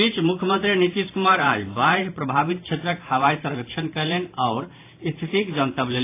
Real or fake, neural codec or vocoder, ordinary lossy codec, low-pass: fake; vocoder, 44.1 kHz, 128 mel bands every 512 samples, BigVGAN v2; AAC, 24 kbps; 3.6 kHz